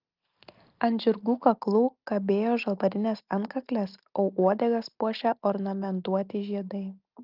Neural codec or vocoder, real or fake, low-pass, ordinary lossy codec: none; real; 5.4 kHz; Opus, 32 kbps